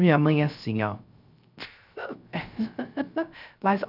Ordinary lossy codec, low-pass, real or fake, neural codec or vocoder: none; 5.4 kHz; fake; codec, 16 kHz, 0.3 kbps, FocalCodec